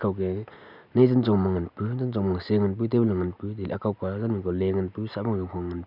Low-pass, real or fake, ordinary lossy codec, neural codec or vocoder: 5.4 kHz; real; none; none